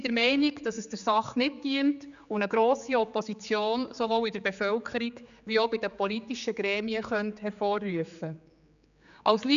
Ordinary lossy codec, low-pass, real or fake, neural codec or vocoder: none; 7.2 kHz; fake; codec, 16 kHz, 4 kbps, X-Codec, HuBERT features, trained on general audio